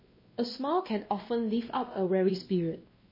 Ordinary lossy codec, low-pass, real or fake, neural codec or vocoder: MP3, 24 kbps; 5.4 kHz; fake; codec, 16 kHz, 1 kbps, X-Codec, WavLM features, trained on Multilingual LibriSpeech